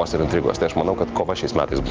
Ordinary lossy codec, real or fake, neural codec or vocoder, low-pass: Opus, 32 kbps; real; none; 7.2 kHz